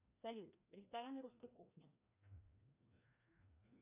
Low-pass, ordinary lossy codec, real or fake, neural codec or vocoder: 3.6 kHz; AAC, 32 kbps; fake; codec, 16 kHz, 1 kbps, FreqCodec, larger model